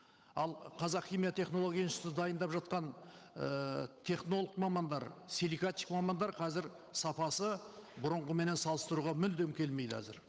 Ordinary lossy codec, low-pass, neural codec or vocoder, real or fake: none; none; codec, 16 kHz, 8 kbps, FunCodec, trained on Chinese and English, 25 frames a second; fake